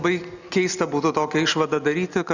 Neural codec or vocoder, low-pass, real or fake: none; 7.2 kHz; real